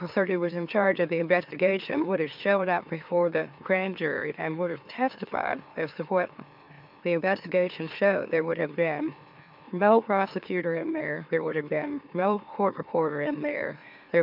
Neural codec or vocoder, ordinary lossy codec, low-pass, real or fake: autoencoder, 44.1 kHz, a latent of 192 numbers a frame, MeloTTS; MP3, 48 kbps; 5.4 kHz; fake